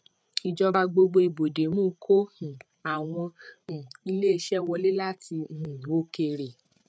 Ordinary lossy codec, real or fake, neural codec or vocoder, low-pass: none; fake; codec, 16 kHz, 8 kbps, FreqCodec, larger model; none